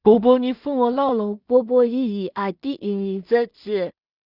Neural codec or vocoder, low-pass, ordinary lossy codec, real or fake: codec, 16 kHz in and 24 kHz out, 0.4 kbps, LongCat-Audio-Codec, two codebook decoder; 5.4 kHz; Opus, 64 kbps; fake